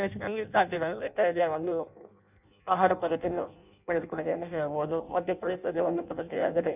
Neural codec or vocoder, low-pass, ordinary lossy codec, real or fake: codec, 16 kHz in and 24 kHz out, 0.6 kbps, FireRedTTS-2 codec; 3.6 kHz; none; fake